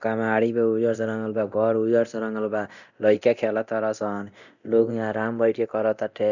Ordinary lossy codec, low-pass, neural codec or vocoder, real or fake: none; 7.2 kHz; codec, 24 kHz, 0.9 kbps, DualCodec; fake